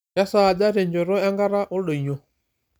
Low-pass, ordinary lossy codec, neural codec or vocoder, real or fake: none; none; none; real